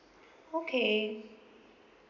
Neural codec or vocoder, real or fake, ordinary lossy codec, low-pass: none; real; none; 7.2 kHz